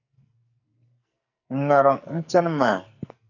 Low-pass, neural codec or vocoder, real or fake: 7.2 kHz; codec, 44.1 kHz, 2.6 kbps, SNAC; fake